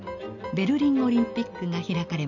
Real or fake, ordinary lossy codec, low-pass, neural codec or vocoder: real; none; 7.2 kHz; none